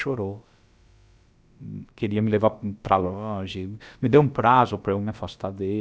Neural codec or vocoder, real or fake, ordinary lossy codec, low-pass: codec, 16 kHz, about 1 kbps, DyCAST, with the encoder's durations; fake; none; none